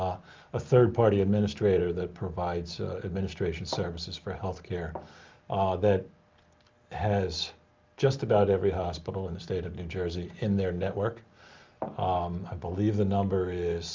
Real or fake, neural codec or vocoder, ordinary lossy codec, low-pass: real; none; Opus, 32 kbps; 7.2 kHz